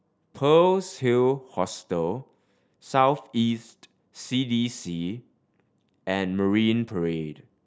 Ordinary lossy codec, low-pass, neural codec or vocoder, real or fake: none; none; none; real